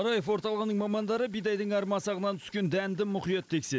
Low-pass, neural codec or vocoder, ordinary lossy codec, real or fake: none; none; none; real